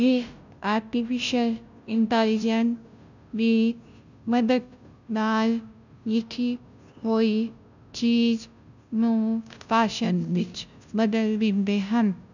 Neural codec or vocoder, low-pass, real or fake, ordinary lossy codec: codec, 16 kHz, 0.5 kbps, FunCodec, trained on Chinese and English, 25 frames a second; 7.2 kHz; fake; none